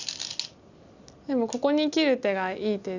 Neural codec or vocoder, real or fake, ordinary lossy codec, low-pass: none; real; none; 7.2 kHz